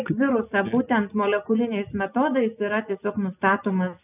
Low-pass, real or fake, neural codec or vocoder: 3.6 kHz; real; none